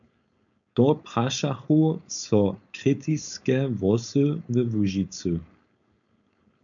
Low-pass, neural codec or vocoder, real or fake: 7.2 kHz; codec, 16 kHz, 4.8 kbps, FACodec; fake